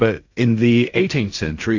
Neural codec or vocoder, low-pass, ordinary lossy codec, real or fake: codec, 16 kHz in and 24 kHz out, 0.4 kbps, LongCat-Audio-Codec, fine tuned four codebook decoder; 7.2 kHz; AAC, 48 kbps; fake